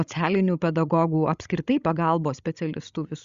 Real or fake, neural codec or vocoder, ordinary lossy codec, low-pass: fake; codec, 16 kHz, 16 kbps, FreqCodec, larger model; Opus, 64 kbps; 7.2 kHz